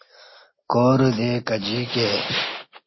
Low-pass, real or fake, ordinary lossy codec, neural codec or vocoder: 7.2 kHz; real; MP3, 24 kbps; none